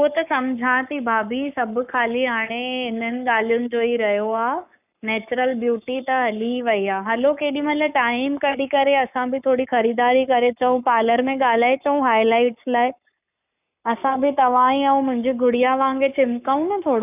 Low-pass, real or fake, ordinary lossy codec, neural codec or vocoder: 3.6 kHz; fake; none; codec, 44.1 kHz, 7.8 kbps, Pupu-Codec